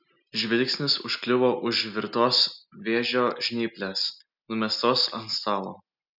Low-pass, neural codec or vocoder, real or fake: 5.4 kHz; none; real